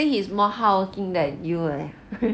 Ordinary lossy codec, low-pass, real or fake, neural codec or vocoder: none; none; real; none